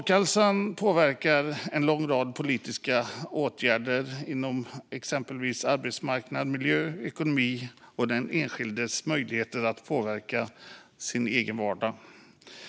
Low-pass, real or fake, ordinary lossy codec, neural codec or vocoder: none; real; none; none